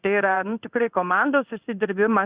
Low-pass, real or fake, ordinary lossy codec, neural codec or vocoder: 3.6 kHz; fake; Opus, 64 kbps; codec, 16 kHz in and 24 kHz out, 1 kbps, XY-Tokenizer